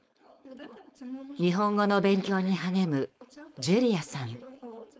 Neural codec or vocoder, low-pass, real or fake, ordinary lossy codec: codec, 16 kHz, 4.8 kbps, FACodec; none; fake; none